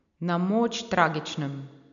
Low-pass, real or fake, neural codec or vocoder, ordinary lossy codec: 7.2 kHz; real; none; none